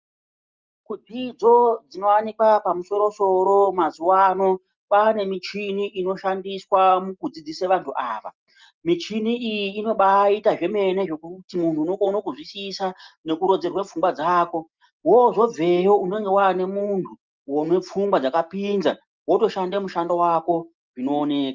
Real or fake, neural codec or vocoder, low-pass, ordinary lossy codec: real; none; 7.2 kHz; Opus, 24 kbps